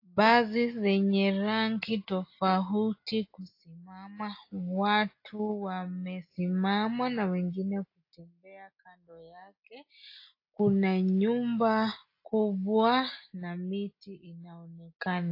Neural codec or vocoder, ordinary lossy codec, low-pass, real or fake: none; AAC, 32 kbps; 5.4 kHz; real